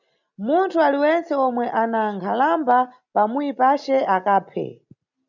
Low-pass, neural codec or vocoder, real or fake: 7.2 kHz; none; real